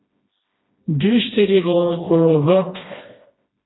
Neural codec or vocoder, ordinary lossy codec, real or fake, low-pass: codec, 16 kHz, 1 kbps, FreqCodec, smaller model; AAC, 16 kbps; fake; 7.2 kHz